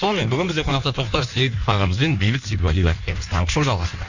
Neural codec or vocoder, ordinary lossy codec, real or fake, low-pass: codec, 16 kHz in and 24 kHz out, 1.1 kbps, FireRedTTS-2 codec; none; fake; 7.2 kHz